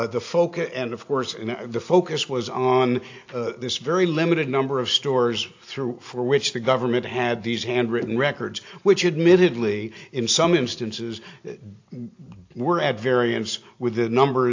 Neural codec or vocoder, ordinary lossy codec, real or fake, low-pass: none; AAC, 48 kbps; real; 7.2 kHz